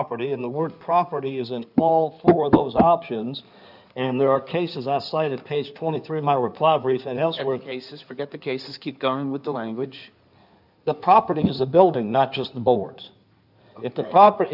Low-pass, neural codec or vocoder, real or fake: 5.4 kHz; codec, 16 kHz in and 24 kHz out, 2.2 kbps, FireRedTTS-2 codec; fake